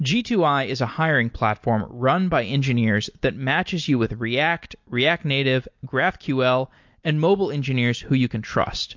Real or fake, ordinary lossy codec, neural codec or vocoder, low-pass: real; MP3, 48 kbps; none; 7.2 kHz